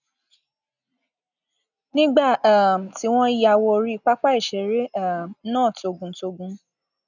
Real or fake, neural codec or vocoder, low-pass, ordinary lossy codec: real; none; 7.2 kHz; none